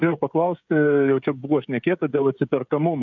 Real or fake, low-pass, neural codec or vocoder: fake; 7.2 kHz; codec, 16 kHz, 16 kbps, FreqCodec, smaller model